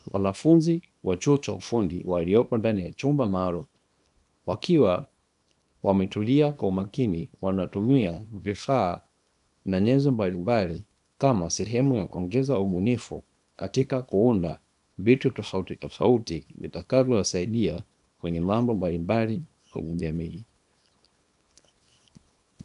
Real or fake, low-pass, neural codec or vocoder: fake; 10.8 kHz; codec, 24 kHz, 0.9 kbps, WavTokenizer, small release